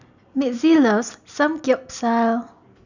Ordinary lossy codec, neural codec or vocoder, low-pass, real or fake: none; none; 7.2 kHz; real